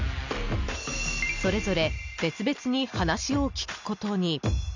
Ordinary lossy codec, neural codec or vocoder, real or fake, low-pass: none; none; real; 7.2 kHz